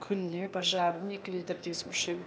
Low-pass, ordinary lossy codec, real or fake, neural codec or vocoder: none; none; fake; codec, 16 kHz, 0.8 kbps, ZipCodec